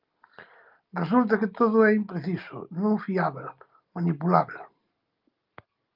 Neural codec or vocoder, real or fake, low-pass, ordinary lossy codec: none; real; 5.4 kHz; Opus, 32 kbps